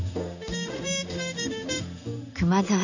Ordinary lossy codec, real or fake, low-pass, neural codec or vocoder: none; fake; 7.2 kHz; codec, 16 kHz in and 24 kHz out, 1 kbps, XY-Tokenizer